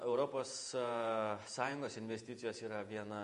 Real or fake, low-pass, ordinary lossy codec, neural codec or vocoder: fake; 14.4 kHz; MP3, 48 kbps; vocoder, 48 kHz, 128 mel bands, Vocos